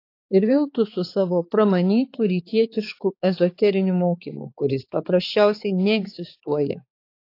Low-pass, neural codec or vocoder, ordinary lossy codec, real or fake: 5.4 kHz; codec, 16 kHz, 4 kbps, X-Codec, HuBERT features, trained on balanced general audio; AAC, 32 kbps; fake